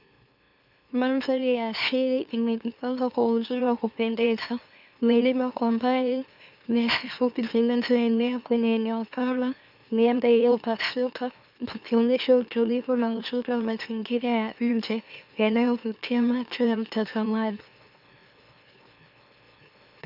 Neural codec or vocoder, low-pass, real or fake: autoencoder, 44.1 kHz, a latent of 192 numbers a frame, MeloTTS; 5.4 kHz; fake